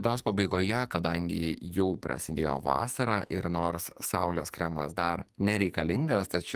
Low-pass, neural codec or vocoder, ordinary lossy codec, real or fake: 14.4 kHz; codec, 44.1 kHz, 2.6 kbps, SNAC; Opus, 32 kbps; fake